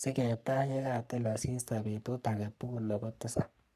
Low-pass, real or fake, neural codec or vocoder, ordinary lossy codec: 14.4 kHz; fake; codec, 44.1 kHz, 3.4 kbps, Pupu-Codec; none